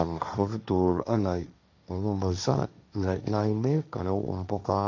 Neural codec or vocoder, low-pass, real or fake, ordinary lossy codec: codec, 16 kHz, 1.1 kbps, Voila-Tokenizer; 7.2 kHz; fake; none